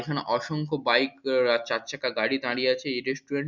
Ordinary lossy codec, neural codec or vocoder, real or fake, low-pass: none; none; real; 7.2 kHz